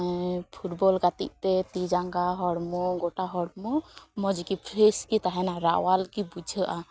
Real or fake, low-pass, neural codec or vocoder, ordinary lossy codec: real; none; none; none